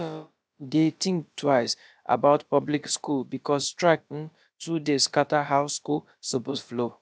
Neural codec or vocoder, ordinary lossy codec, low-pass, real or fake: codec, 16 kHz, about 1 kbps, DyCAST, with the encoder's durations; none; none; fake